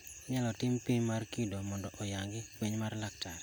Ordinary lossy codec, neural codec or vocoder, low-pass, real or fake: none; none; none; real